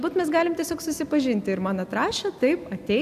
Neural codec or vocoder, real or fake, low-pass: none; real; 14.4 kHz